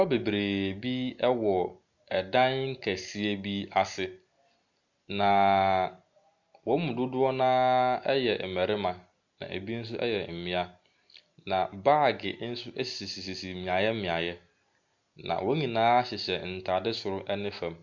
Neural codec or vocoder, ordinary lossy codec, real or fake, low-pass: none; MP3, 64 kbps; real; 7.2 kHz